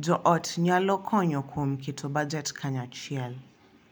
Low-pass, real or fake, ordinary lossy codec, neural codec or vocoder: none; real; none; none